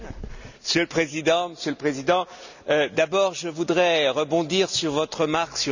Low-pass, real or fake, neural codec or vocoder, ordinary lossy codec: 7.2 kHz; real; none; none